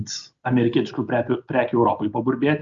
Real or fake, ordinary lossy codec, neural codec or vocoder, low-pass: real; Opus, 64 kbps; none; 7.2 kHz